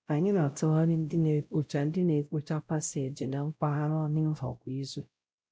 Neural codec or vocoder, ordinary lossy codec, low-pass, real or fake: codec, 16 kHz, 0.5 kbps, X-Codec, WavLM features, trained on Multilingual LibriSpeech; none; none; fake